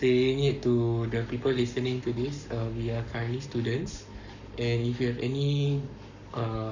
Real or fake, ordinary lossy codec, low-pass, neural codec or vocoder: fake; none; 7.2 kHz; codec, 44.1 kHz, 7.8 kbps, Pupu-Codec